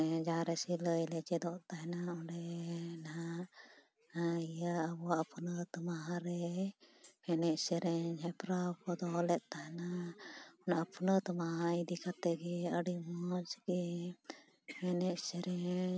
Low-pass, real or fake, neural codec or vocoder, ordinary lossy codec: none; real; none; none